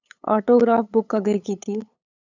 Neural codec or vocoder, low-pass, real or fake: codec, 16 kHz, 8 kbps, FunCodec, trained on LibriTTS, 25 frames a second; 7.2 kHz; fake